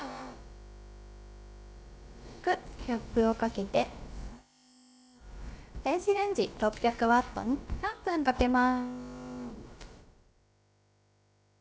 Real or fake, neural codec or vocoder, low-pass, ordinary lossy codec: fake; codec, 16 kHz, about 1 kbps, DyCAST, with the encoder's durations; none; none